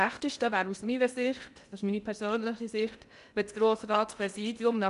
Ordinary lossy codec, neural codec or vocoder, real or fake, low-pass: none; codec, 16 kHz in and 24 kHz out, 0.8 kbps, FocalCodec, streaming, 65536 codes; fake; 10.8 kHz